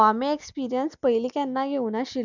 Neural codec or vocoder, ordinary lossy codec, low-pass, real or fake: none; none; 7.2 kHz; real